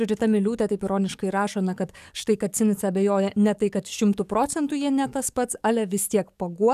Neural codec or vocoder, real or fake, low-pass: codec, 44.1 kHz, 7.8 kbps, DAC; fake; 14.4 kHz